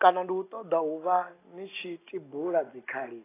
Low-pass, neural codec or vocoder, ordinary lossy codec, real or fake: 3.6 kHz; none; AAC, 16 kbps; real